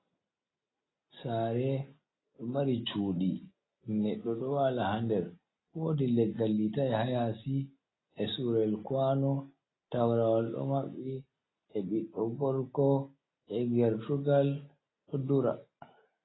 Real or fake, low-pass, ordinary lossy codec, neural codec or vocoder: real; 7.2 kHz; AAC, 16 kbps; none